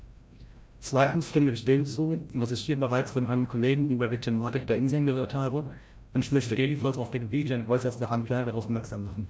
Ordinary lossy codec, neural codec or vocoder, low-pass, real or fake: none; codec, 16 kHz, 0.5 kbps, FreqCodec, larger model; none; fake